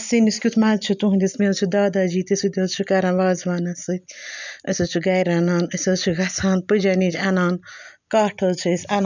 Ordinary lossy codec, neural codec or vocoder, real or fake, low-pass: none; none; real; 7.2 kHz